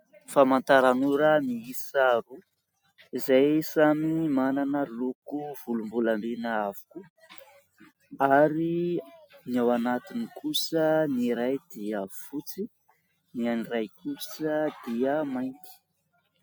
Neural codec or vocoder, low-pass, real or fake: none; 19.8 kHz; real